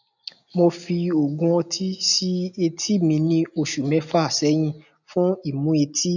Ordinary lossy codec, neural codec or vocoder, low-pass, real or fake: none; none; 7.2 kHz; real